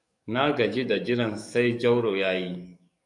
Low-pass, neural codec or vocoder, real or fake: 10.8 kHz; codec, 44.1 kHz, 7.8 kbps, DAC; fake